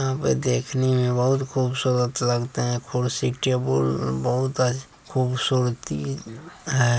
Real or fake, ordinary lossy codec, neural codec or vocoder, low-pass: real; none; none; none